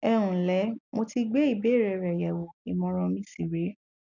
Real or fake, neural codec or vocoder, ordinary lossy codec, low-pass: real; none; none; 7.2 kHz